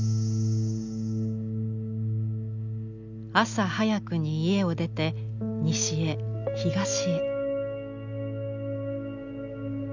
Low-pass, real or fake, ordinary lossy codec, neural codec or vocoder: 7.2 kHz; real; none; none